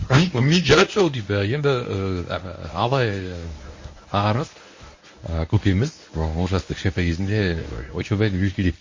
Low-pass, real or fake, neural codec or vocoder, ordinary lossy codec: 7.2 kHz; fake; codec, 24 kHz, 0.9 kbps, WavTokenizer, medium speech release version 1; MP3, 32 kbps